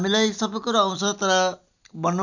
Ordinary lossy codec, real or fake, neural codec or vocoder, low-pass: none; real; none; 7.2 kHz